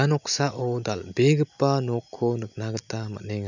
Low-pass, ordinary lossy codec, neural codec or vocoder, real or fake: 7.2 kHz; none; none; real